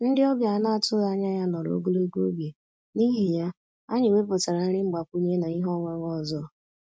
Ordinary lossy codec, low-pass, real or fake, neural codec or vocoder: none; none; real; none